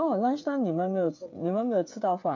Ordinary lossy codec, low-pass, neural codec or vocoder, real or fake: MP3, 64 kbps; 7.2 kHz; codec, 16 kHz, 8 kbps, FreqCodec, smaller model; fake